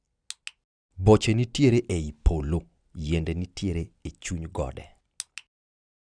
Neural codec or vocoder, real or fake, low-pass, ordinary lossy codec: none; real; 9.9 kHz; none